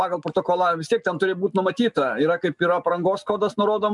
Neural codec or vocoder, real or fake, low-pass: none; real; 10.8 kHz